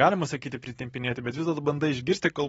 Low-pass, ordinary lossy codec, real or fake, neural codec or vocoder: 19.8 kHz; AAC, 24 kbps; fake; vocoder, 44.1 kHz, 128 mel bands every 512 samples, BigVGAN v2